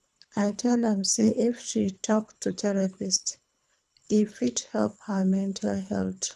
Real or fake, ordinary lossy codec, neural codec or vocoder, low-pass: fake; none; codec, 24 kHz, 3 kbps, HILCodec; none